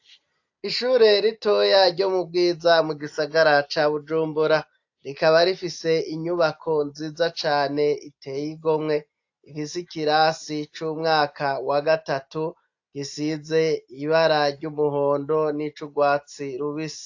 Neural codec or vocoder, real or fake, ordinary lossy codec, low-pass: none; real; AAC, 48 kbps; 7.2 kHz